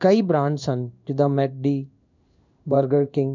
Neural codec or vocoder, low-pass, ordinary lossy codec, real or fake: codec, 16 kHz in and 24 kHz out, 1 kbps, XY-Tokenizer; 7.2 kHz; none; fake